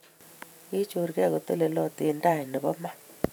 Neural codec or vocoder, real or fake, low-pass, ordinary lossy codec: vocoder, 44.1 kHz, 128 mel bands every 512 samples, BigVGAN v2; fake; none; none